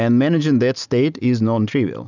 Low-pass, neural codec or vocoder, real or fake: 7.2 kHz; none; real